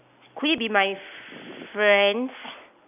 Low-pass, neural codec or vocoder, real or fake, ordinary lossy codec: 3.6 kHz; none; real; none